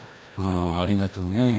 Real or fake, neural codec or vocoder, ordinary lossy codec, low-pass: fake; codec, 16 kHz, 1 kbps, FreqCodec, larger model; none; none